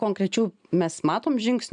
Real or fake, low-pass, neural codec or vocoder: real; 9.9 kHz; none